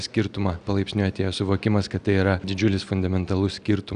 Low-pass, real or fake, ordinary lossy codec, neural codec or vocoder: 9.9 kHz; real; Opus, 64 kbps; none